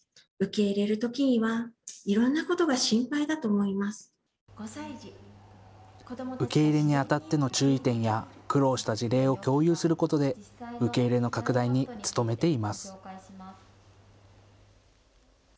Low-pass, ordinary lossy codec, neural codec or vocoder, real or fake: none; none; none; real